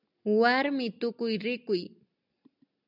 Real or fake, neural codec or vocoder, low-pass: real; none; 5.4 kHz